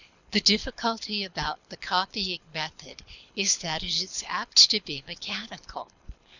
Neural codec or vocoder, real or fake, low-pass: codec, 24 kHz, 6 kbps, HILCodec; fake; 7.2 kHz